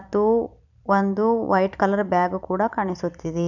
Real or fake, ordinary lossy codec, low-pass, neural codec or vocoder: fake; none; 7.2 kHz; vocoder, 44.1 kHz, 128 mel bands every 256 samples, BigVGAN v2